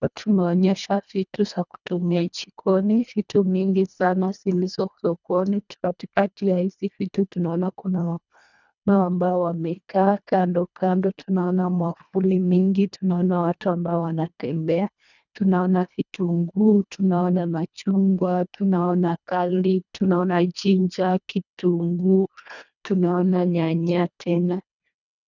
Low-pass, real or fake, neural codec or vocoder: 7.2 kHz; fake; codec, 24 kHz, 1.5 kbps, HILCodec